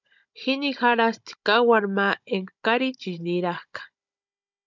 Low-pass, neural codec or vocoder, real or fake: 7.2 kHz; codec, 16 kHz, 16 kbps, FunCodec, trained on Chinese and English, 50 frames a second; fake